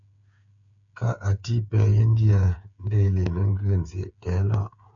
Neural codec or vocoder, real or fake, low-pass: codec, 16 kHz, 8 kbps, FreqCodec, smaller model; fake; 7.2 kHz